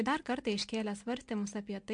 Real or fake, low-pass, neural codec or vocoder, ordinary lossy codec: real; 9.9 kHz; none; MP3, 64 kbps